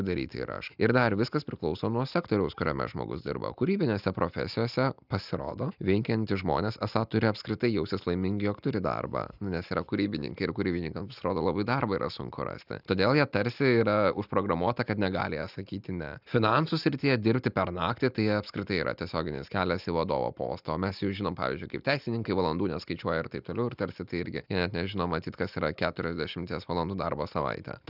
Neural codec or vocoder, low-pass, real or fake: none; 5.4 kHz; real